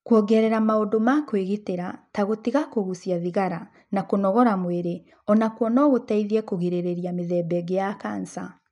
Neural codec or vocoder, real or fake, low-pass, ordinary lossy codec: none; real; 10.8 kHz; none